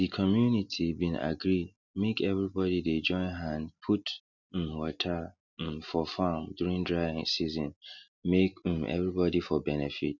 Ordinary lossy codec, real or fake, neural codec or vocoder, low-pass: none; real; none; 7.2 kHz